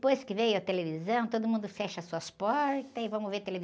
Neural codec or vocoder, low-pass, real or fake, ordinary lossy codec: none; none; real; none